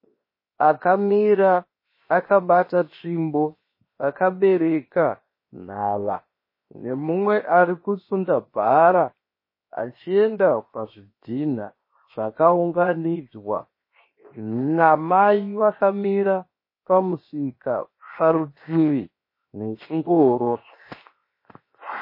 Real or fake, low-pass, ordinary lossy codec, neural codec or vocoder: fake; 5.4 kHz; MP3, 24 kbps; codec, 16 kHz, 0.7 kbps, FocalCodec